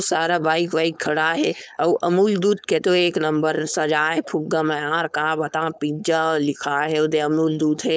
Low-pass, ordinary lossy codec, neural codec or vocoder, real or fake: none; none; codec, 16 kHz, 4.8 kbps, FACodec; fake